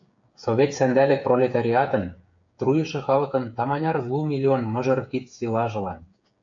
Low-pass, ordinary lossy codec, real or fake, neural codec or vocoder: 7.2 kHz; AAC, 64 kbps; fake; codec, 16 kHz, 8 kbps, FreqCodec, smaller model